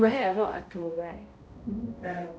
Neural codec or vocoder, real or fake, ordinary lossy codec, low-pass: codec, 16 kHz, 0.5 kbps, X-Codec, HuBERT features, trained on balanced general audio; fake; none; none